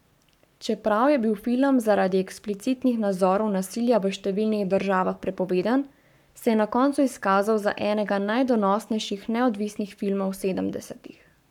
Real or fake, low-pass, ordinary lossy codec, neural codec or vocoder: fake; 19.8 kHz; none; codec, 44.1 kHz, 7.8 kbps, Pupu-Codec